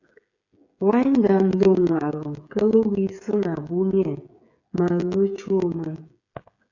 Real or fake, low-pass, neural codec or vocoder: fake; 7.2 kHz; codec, 16 kHz, 8 kbps, FreqCodec, smaller model